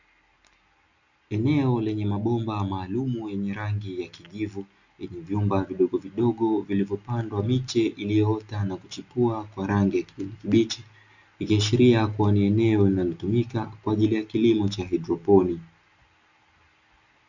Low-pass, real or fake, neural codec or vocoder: 7.2 kHz; real; none